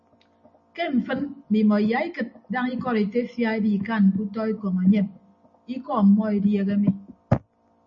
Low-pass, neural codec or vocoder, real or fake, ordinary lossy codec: 7.2 kHz; none; real; MP3, 32 kbps